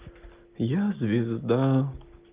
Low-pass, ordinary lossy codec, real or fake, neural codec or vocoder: 3.6 kHz; Opus, 32 kbps; real; none